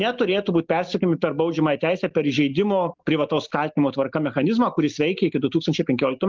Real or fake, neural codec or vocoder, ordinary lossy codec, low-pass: real; none; Opus, 24 kbps; 7.2 kHz